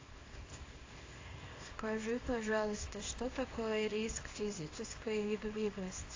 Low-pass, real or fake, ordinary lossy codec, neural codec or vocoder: 7.2 kHz; fake; AAC, 32 kbps; codec, 24 kHz, 0.9 kbps, WavTokenizer, small release